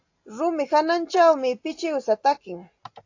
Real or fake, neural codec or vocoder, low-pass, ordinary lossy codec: real; none; 7.2 kHz; AAC, 48 kbps